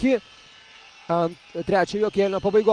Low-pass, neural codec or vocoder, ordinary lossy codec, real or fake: 9.9 kHz; none; Opus, 32 kbps; real